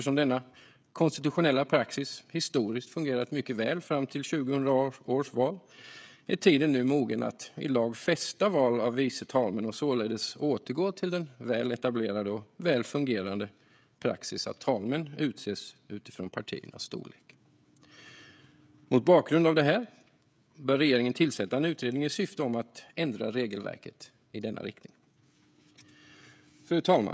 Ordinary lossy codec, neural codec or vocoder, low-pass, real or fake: none; codec, 16 kHz, 16 kbps, FreqCodec, smaller model; none; fake